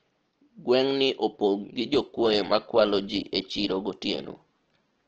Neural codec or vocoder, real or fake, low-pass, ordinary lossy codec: none; real; 7.2 kHz; Opus, 16 kbps